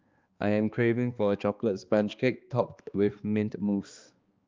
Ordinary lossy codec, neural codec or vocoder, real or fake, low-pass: Opus, 24 kbps; codec, 16 kHz, 2 kbps, X-Codec, HuBERT features, trained on balanced general audio; fake; 7.2 kHz